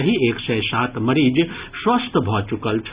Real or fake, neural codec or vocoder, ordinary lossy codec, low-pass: real; none; Opus, 64 kbps; 3.6 kHz